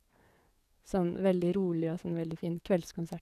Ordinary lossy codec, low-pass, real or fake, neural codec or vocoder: none; 14.4 kHz; fake; codec, 44.1 kHz, 7.8 kbps, DAC